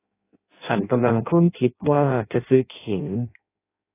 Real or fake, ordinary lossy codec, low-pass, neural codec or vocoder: fake; AAC, 24 kbps; 3.6 kHz; codec, 16 kHz in and 24 kHz out, 0.6 kbps, FireRedTTS-2 codec